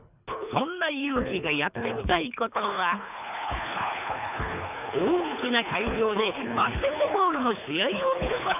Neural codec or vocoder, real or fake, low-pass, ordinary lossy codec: codec, 24 kHz, 3 kbps, HILCodec; fake; 3.6 kHz; none